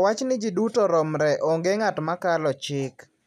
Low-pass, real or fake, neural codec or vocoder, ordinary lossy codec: 14.4 kHz; real; none; none